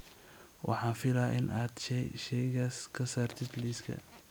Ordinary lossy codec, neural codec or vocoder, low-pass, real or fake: none; none; none; real